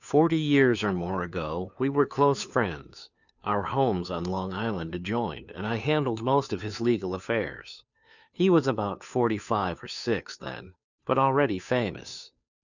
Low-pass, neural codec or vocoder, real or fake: 7.2 kHz; codec, 16 kHz, 2 kbps, FunCodec, trained on Chinese and English, 25 frames a second; fake